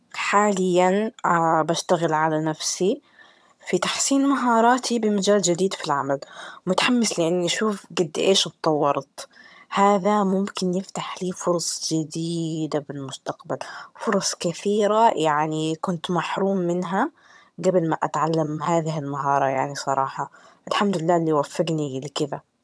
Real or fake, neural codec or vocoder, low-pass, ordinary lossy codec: fake; vocoder, 22.05 kHz, 80 mel bands, HiFi-GAN; none; none